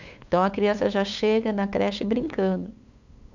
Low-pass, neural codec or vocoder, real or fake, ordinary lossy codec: 7.2 kHz; codec, 16 kHz, 8 kbps, FunCodec, trained on LibriTTS, 25 frames a second; fake; none